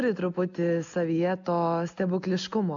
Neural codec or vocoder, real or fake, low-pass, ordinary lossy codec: none; real; 7.2 kHz; MP3, 64 kbps